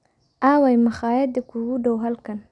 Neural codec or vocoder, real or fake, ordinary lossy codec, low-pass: none; real; none; 10.8 kHz